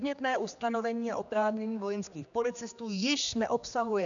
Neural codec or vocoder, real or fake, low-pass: codec, 16 kHz, 2 kbps, X-Codec, HuBERT features, trained on general audio; fake; 7.2 kHz